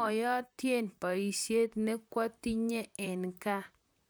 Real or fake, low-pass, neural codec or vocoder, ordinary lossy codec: fake; none; vocoder, 44.1 kHz, 128 mel bands, Pupu-Vocoder; none